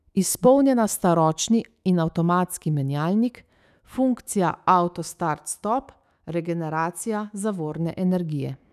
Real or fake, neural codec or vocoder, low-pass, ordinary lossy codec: fake; autoencoder, 48 kHz, 128 numbers a frame, DAC-VAE, trained on Japanese speech; 14.4 kHz; none